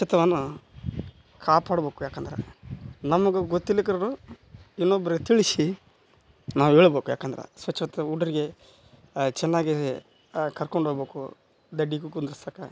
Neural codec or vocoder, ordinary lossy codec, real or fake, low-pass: none; none; real; none